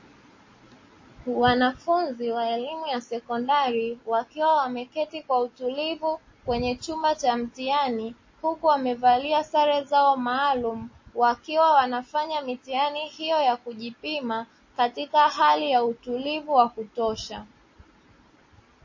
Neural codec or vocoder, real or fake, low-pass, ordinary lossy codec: none; real; 7.2 kHz; MP3, 32 kbps